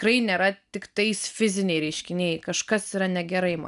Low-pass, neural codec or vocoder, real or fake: 10.8 kHz; none; real